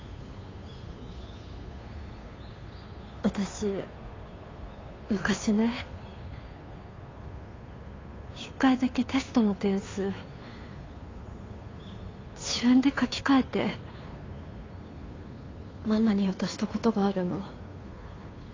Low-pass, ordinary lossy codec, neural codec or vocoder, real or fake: 7.2 kHz; AAC, 32 kbps; codec, 16 kHz, 2 kbps, FunCodec, trained on Chinese and English, 25 frames a second; fake